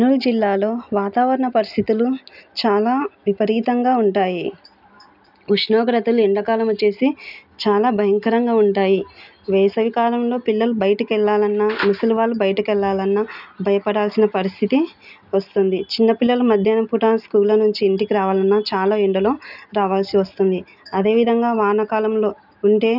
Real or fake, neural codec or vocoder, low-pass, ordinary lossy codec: real; none; 5.4 kHz; none